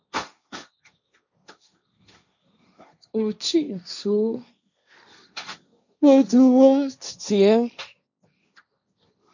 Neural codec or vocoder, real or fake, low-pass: codec, 16 kHz, 1.1 kbps, Voila-Tokenizer; fake; 7.2 kHz